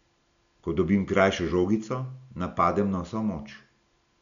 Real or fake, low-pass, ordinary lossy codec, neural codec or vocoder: real; 7.2 kHz; none; none